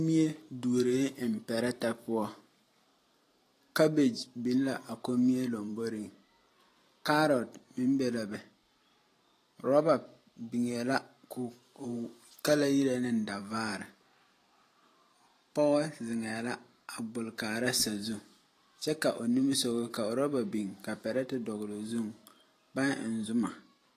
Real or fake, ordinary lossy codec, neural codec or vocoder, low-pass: fake; AAC, 48 kbps; vocoder, 44.1 kHz, 128 mel bands every 512 samples, BigVGAN v2; 14.4 kHz